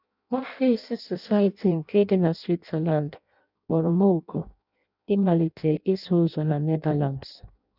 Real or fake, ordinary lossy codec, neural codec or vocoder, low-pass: fake; none; codec, 16 kHz in and 24 kHz out, 0.6 kbps, FireRedTTS-2 codec; 5.4 kHz